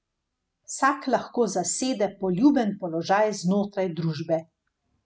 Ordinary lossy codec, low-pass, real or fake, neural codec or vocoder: none; none; real; none